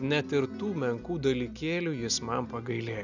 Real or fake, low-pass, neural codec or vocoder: real; 7.2 kHz; none